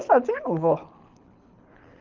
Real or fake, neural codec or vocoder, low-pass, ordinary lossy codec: fake; codec, 24 kHz, 6 kbps, HILCodec; 7.2 kHz; Opus, 24 kbps